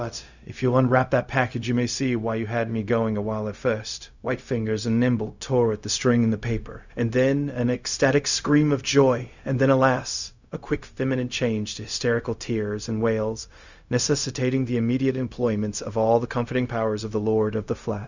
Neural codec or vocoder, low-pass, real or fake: codec, 16 kHz, 0.4 kbps, LongCat-Audio-Codec; 7.2 kHz; fake